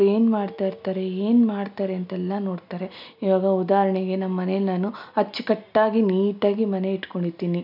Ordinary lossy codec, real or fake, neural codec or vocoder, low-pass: none; real; none; 5.4 kHz